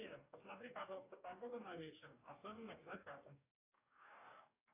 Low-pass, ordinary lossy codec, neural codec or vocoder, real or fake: 3.6 kHz; AAC, 24 kbps; codec, 44.1 kHz, 2.6 kbps, DAC; fake